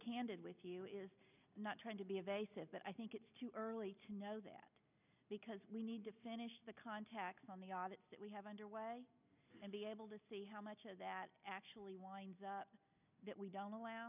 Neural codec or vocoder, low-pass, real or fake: none; 3.6 kHz; real